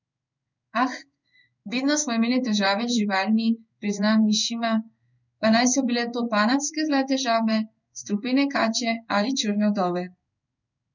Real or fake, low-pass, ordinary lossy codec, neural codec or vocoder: fake; 7.2 kHz; none; codec, 16 kHz in and 24 kHz out, 1 kbps, XY-Tokenizer